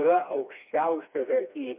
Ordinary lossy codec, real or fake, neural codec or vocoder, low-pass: MP3, 32 kbps; fake; codec, 16 kHz, 2 kbps, FreqCodec, smaller model; 3.6 kHz